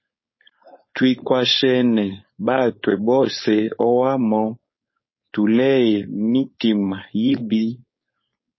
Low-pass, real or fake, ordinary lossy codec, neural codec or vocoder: 7.2 kHz; fake; MP3, 24 kbps; codec, 16 kHz, 4.8 kbps, FACodec